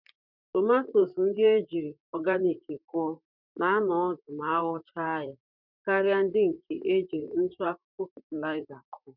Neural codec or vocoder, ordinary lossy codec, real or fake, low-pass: vocoder, 44.1 kHz, 128 mel bands, Pupu-Vocoder; none; fake; 5.4 kHz